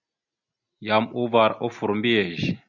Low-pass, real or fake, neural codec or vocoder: 7.2 kHz; real; none